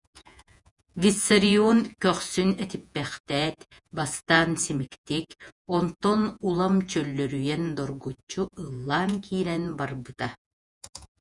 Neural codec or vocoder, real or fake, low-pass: vocoder, 48 kHz, 128 mel bands, Vocos; fake; 10.8 kHz